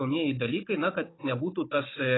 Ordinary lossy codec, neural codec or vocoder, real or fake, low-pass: AAC, 16 kbps; codec, 16 kHz, 16 kbps, FunCodec, trained on Chinese and English, 50 frames a second; fake; 7.2 kHz